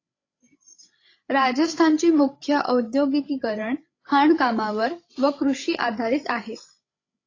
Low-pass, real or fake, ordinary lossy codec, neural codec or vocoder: 7.2 kHz; fake; AAC, 32 kbps; codec, 16 kHz, 16 kbps, FreqCodec, larger model